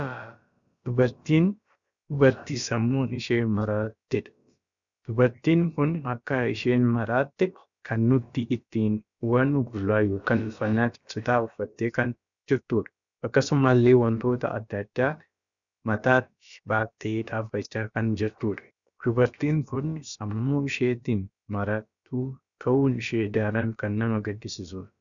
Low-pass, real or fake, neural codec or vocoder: 7.2 kHz; fake; codec, 16 kHz, about 1 kbps, DyCAST, with the encoder's durations